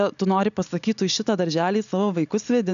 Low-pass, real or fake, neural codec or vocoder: 7.2 kHz; real; none